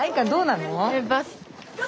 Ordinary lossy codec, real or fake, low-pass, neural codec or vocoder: none; real; none; none